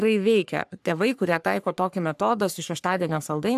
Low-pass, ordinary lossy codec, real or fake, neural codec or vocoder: 14.4 kHz; AAC, 96 kbps; fake; codec, 44.1 kHz, 3.4 kbps, Pupu-Codec